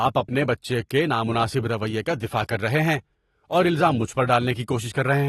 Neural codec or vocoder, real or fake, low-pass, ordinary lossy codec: none; real; 19.8 kHz; AAC, 32 kbps